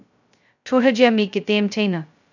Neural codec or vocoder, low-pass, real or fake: codec, 16 kHz, 0.2 kbps, FocalCodec; 7.2 kHz; fake